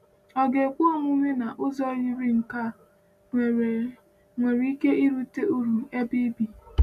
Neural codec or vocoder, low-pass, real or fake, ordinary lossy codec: none; 14.4 kHz; real; none